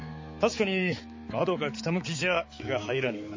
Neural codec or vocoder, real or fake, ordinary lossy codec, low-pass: codec, 16 kHz, 4 kbps, X-Codec, HuBERT features, trained on balanced general audio; fake; MP3, 32 kbps; 7.2 kHz